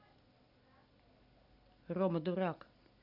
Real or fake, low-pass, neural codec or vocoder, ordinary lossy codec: real; 5.4 kHz; none; none